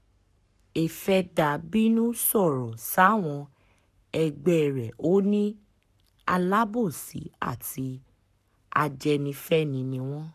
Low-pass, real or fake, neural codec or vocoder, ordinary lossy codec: 14.4 kHz; fake; codec, 44.1 kHz, 7.8 kbps, Pupu-Codec; none